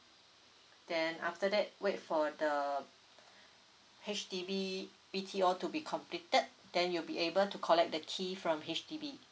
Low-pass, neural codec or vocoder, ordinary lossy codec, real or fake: none; none; none; real